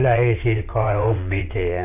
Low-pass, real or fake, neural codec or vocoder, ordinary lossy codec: 3.6 kHz; fake; vocoder, 22.05 kHz, 80 mel bands, WaveNeXt; none